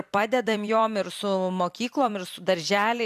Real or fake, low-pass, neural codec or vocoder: fake; 14.4 kHz; vocoder, 44.1 kHz, 128 mel bands every 256 samples, BigVGAN v2